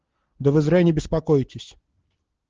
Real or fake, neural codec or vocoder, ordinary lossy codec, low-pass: real; none; Opus, 16 kbps; 7.2 kHz